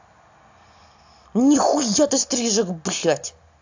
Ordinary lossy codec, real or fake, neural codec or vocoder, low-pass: AAC, 48 kbps; real; none; 7.2 kHz